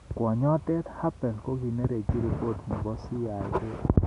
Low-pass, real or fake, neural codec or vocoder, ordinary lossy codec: 10.8 kHz; real; none; none